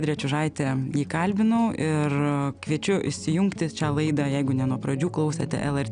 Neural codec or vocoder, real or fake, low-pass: none; real; 9.9 kHz